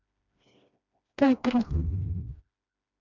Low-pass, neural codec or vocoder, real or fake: 7.2 kHz; codec, 16 kHz, 1 kbps, FreqCodec, smaller model; fake